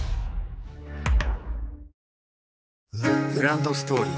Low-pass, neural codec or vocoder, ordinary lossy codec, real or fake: none; codec, 16 kHz, 4 kbps, X-Codec, HuBERT features, trained on general audio; none; fake